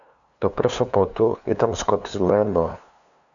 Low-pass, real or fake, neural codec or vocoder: 7.2 kHz; fake; codec, 16 kHz, 2 kbps, FunCodec, trained on LibriTTS, 25 frames a second